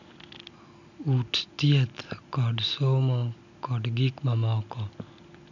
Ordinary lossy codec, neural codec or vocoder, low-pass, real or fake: none; none; 7.2 kHz; real